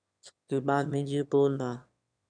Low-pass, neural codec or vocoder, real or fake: 9.9 kHz; autoencoder, 22.05 kHz, a latent of 192 numbers a frame, VITS, trained on one speaker; fake